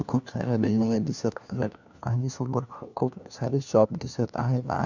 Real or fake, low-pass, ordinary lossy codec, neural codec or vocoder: fake; 7.2 kHz; none; codec, 16 kHz, 1 kbps, FunCodec, trained on LibriTTS, 50 frames a second